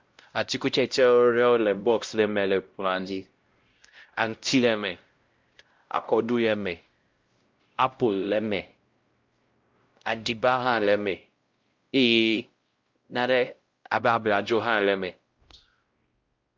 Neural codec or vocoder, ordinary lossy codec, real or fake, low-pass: codec, 16 kHz, 0.5 kbps, X-Codec, WavLM features, trained on Multilingual LibriSpeech; Opus, 32 kbps; fake; 7.2 kHz